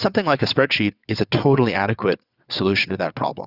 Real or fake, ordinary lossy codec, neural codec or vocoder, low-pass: fake; Opus, 64 kbps; vocoder, 22.05 kHz, 80 mel bands, WaveNeXt; 5.4 kHz